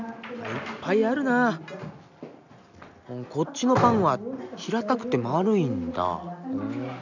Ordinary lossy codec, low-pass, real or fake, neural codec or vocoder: none; 7.2 kHz; real; none